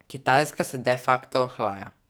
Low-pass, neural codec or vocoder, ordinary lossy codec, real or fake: none; codec, 44.1 kHz, 2.6 kbps, SNAC; none; fake